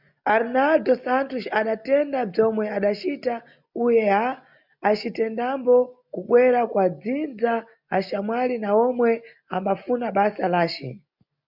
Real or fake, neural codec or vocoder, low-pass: real; none; 5.4 kHz